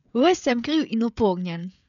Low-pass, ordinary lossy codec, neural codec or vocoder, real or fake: 7.2 kHz; none; codec, 16 kHz, 16 kbps, FreqCodec, smaller model; fake